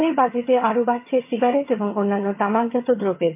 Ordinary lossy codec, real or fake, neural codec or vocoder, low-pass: MP3, 32 kbps; fake; vocoder, 22.05 kHz, 80 mel bands, HiFi-GAN; 3.6 kHz